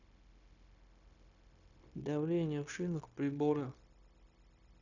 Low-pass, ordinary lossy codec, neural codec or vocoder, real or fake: 7.2 kHz; none; codec, 16 kHz, 0.9 kbps, LongCat-Audio-Codec; fake